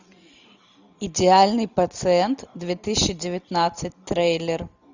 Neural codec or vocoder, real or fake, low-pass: none; real; 7.2 kHz